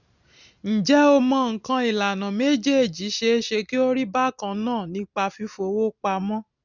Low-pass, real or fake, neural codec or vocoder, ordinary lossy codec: 7.2 kHz; real; none; none